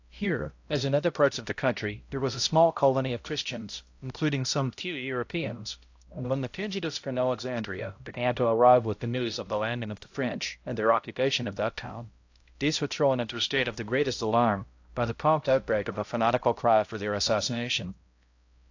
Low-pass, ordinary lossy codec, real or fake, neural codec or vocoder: 7.2 kHz; AAC, 48 kbps; fake; codec, 16 kHz, 0.5 kbps, X-Codec, HuBERT features, trained on balanced general audio